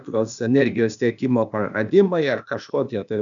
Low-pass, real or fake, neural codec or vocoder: 7.2 kHz; fake; codec, 16 kHz, 0.8 kbps, ZipCodec